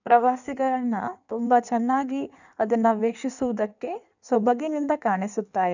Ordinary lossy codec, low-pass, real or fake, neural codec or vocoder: none; 7.2 kHz; fake; codec, 16 kHz in and 24 kHz out, 1.1 kbps, FireRedTTS-2 codec